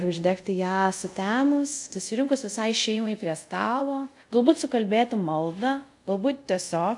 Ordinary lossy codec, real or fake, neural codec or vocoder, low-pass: AAC, 64 kbps; fake; codec, 24 kHz, 0.5 kbps, DualCodec; 10.8 kHz